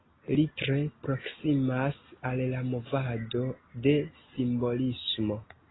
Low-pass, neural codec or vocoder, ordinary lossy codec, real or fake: 7.2 kHz; none; AAC, 16 kbps; real